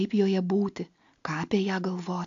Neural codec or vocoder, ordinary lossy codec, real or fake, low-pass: none; AAC, 64 kbps; real; 7.2 kHz